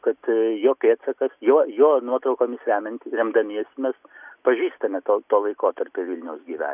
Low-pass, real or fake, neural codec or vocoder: 3.6 kHz; real; none